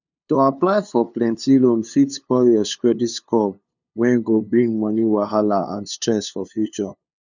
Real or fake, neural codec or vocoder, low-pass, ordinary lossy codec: fake; codec, 16 kHz, 2 kbps, FunCodec, trained on LibriTTS, 25 frames a second; 7.2 kHz; none